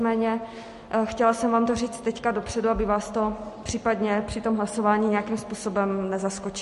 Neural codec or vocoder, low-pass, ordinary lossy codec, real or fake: none; 14.4 kHz; MP3, 48 kbps; real